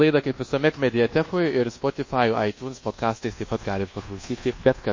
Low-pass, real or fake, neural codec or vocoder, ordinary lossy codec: 7.2 kHz; fake; codec, 24 kHz, 1.2 kbps, DualCodec; MP3, 32 kbps